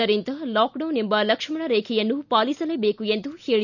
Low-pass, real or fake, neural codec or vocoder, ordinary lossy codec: 7.2 kHz; real; none; none